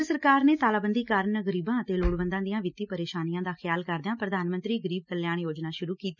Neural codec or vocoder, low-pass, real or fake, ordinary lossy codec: none; 7.2 kHz; real; none